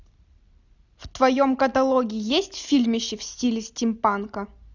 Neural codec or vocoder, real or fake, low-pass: none; real; 7.2 kHz